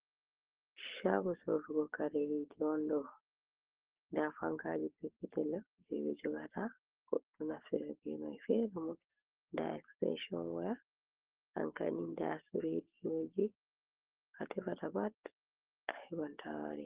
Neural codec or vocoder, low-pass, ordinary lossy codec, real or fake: codec, 44.1 kHz, 7.8 kbps, Pupu-Codec; 3.6 kHz; Opus, 16 kbps; fake